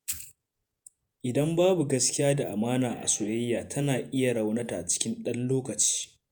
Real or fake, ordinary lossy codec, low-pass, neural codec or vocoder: fake; none; none; vocoder, 48 kHz, 128 mel bands, Vocos